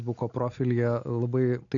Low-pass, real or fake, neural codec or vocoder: 7.2 kHz; real; none